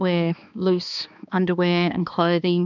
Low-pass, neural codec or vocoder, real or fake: 7.2 kHz; codec, 16 kHz, 2 kbps, X-Codec, HuBERT features, trained on balanced general audio; fake